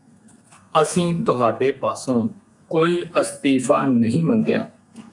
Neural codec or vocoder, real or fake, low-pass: codec, 32 kHz, 1.9 kbps, SNAC; fake; 10.8 kHz